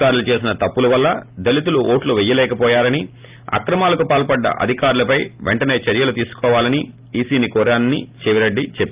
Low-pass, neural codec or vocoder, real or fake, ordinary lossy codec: 3.6 kHz; none; real; Opus, 24 kbps